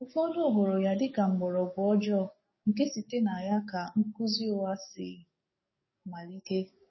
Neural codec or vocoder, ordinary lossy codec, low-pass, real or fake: codec, 44.1 kHz, 7.8 kbps, DAC; MP3, 24 kbps; 7.2 kHz; fake